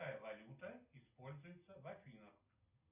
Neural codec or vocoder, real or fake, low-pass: none; real; 3.6 kHz